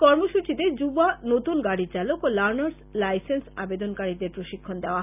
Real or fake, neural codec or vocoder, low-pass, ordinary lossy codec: real; none; 3.6 kHz; none